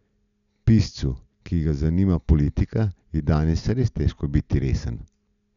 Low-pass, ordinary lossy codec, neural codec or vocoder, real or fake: 7.2 kHz; none; none; real